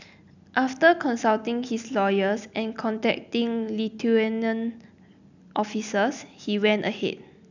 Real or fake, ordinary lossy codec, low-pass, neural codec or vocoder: real; none; 7.2 kHz; none